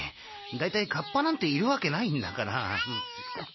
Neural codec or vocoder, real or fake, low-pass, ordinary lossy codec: none; real; 7.2 kHz; MP3, 24 kbps